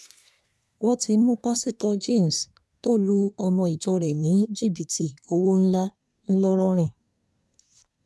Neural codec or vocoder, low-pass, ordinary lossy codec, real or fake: codec, 24 kHz, 1 kbps, SNAC; none; none; fake